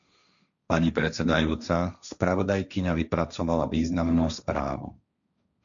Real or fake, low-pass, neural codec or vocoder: fake; 7.2 kHz; codec, 16 kHz, 1.1 kbps, Voila-Tokenizer